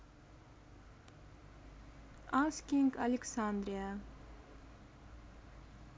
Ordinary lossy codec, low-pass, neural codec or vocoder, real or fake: none; none; none; real